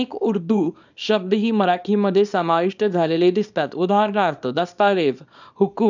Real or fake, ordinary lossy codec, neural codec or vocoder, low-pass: fake; none; codec, 24 kHz, 0.9 kbps, WavTokenizer, small release; 7.2 kHz